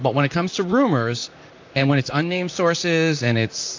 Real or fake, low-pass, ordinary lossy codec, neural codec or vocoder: fake; 7.2 kHz; AAC, 48 kbps; vocoder, 44.1 kHz, 128 mel bands, Pupu-Vocoder